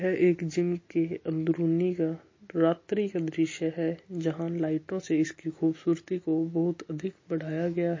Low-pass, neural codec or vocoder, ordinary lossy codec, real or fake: 7.2 kHz; none; MP3, 32 kbps; real